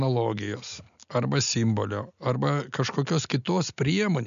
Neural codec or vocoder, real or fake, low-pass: none; real; 7.2 kHz